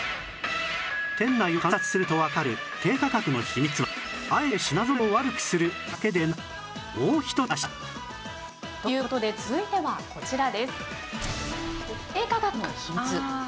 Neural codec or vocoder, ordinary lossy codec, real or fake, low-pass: none; none; real; none